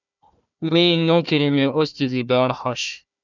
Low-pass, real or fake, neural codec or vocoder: 7.2 kHz; fake; codec, 16 kHz, 1 kbps, FunCodec, trained on Chinese and English, 50 frames a second